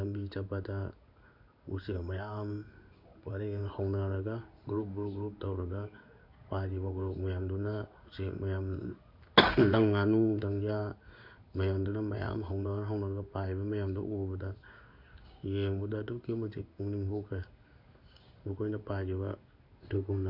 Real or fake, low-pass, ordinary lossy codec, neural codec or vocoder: fake; 5.4 kHz; none; codec, 16 kHz in and 24 kHz out, 1 kbps, XY-Tokenizer